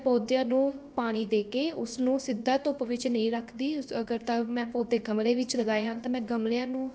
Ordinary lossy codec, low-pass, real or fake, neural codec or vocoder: none; none; fake; codec, 16 kHz, about 1 kbps, DyCAST, with the encoder's durations